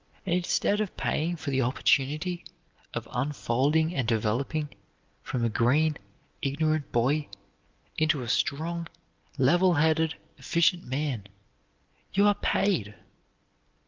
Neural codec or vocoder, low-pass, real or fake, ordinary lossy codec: none; 7.2 kHz; real; Opus, 24 kbps